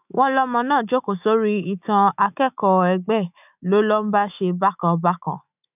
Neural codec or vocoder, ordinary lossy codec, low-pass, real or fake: autoencoder, 48 kHz, 128 numbers a frame, DAC-VAE, trained on Japanese speech; none; 3.6 kHz; fake